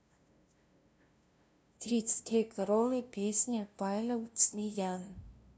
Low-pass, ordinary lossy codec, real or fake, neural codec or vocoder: none; none; fake; codec, 16 kHz, 0.5 kbps, FunCodec, trained on LibriTTS, 25 frames a second